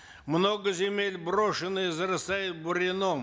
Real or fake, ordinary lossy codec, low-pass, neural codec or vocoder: real; none; none; none